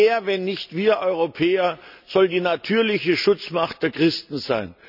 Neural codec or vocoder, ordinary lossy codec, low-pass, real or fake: none; none; 5.4 kHz; real